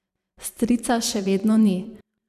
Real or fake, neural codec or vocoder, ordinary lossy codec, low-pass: fake; vocoder, 44.1 kHz, 128 mel bands every 256 samples, BigVGAN v2; none; 14.4 kHz